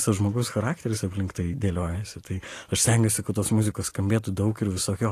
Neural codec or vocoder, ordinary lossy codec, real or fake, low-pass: none; AAC, 48 kbps; real; 14.4 kHz